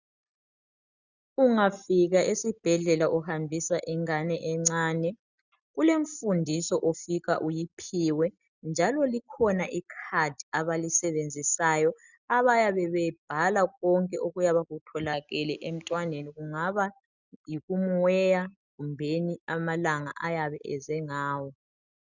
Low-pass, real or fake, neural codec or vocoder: 7.2 kHz; real; none